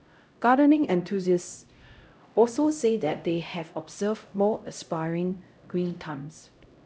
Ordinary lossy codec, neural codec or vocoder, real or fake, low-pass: none; codec, 16 kHz, 0.5 kbps, X-Codec, HuBERT features, trained on LibriSpeech; fake; none